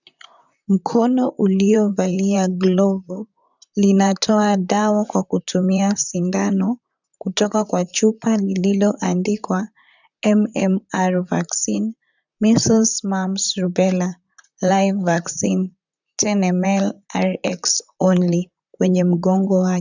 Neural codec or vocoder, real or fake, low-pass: vocoder, 44.1 kHz, 128 mel bands, Pupu-Vocoder; fake; 7.2 kHz